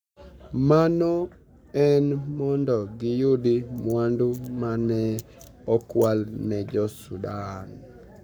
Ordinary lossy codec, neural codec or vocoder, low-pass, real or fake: none; codec, 44.1 kHz, 7.8 kbps, Pupu-Codec; none; fake